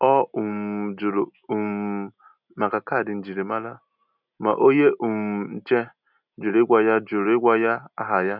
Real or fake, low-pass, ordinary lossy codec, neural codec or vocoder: real; 5.4 kHz; none; none